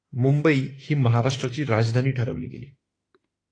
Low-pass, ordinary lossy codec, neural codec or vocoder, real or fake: 9.9 kHz; AAC, 32 kbps; autoencoder, 48 kHz, 32 numbers a frame, DAC-VAE, trained on Japanese speech; fake